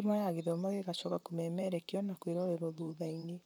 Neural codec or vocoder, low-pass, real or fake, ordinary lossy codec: vocoder, 44.1 kHz, 128 mel bands, Pupu-Vocoder; 19.8 kHz; fake; none